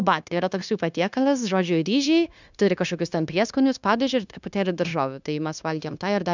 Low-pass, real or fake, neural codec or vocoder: 7.2 kHz; fake; codec, 16 kHz, 0.9 kbps, LongCat-Audio-Codec